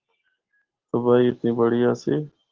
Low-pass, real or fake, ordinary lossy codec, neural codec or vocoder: 7.2 kHz; real; Opus, 16 kbps; none